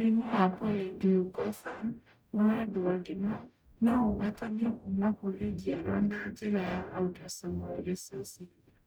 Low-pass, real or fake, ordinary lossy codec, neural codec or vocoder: none; fake; none; codec, 44.1 kHz, 0.9 kbps, DAC